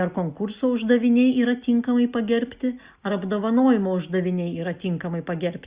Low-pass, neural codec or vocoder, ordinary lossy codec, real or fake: 3.6 kHz; none; Opus, 64 kbps; real